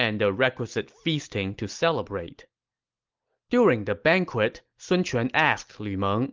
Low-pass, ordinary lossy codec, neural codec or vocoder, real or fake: 7.2 kHz; Opus, 32 kbps; none; real